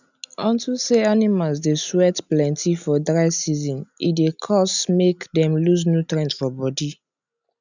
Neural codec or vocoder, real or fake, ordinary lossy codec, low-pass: none; real; none; 7.2 kHz